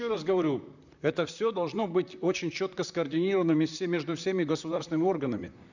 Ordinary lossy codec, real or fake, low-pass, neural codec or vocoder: none; fake; 7.2 kHz; vocoder, 44.1 kHz, 128 mel bands, Pupu-Vocoder